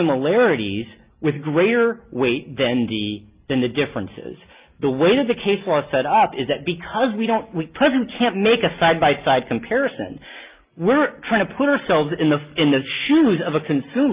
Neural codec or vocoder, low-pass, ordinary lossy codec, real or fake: none; 3.6 kHz; Opus, 32 kbps; real